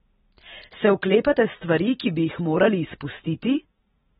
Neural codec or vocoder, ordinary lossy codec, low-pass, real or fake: none; AAC, 16 kbps; 19.8 kHz; real